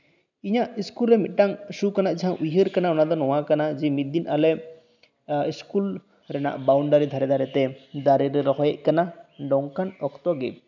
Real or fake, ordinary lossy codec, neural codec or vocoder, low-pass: real; none; none; 7.2 kHz